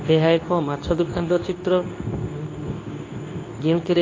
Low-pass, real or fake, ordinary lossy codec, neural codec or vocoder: 7.2 kHz; fake; AAC, 32 kbps; codec, 24 kHz, 0.9 kbps, WavTokenizer, medium speech release version 2